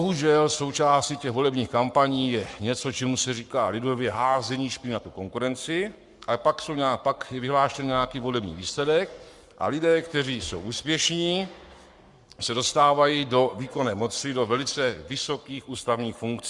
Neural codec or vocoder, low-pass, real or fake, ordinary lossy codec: codec, 44.1 kHz, 7.8 kbps, Pupu-Codec; 10.8 kHz; fake; Opus, 64 kbps